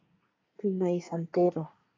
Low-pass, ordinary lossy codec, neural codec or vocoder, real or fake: 7.2 kHz; AAC, 32 kbps; codec, 24 kHz, 1 kbps, SNAC; fake